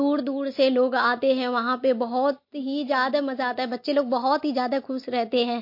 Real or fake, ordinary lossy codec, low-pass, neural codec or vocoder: real; MP3, 32 kbps; 5.4 kHz; none